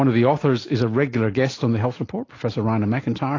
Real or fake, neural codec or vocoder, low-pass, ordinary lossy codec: real; none; 7.2 kHz; AAC, 32 kbps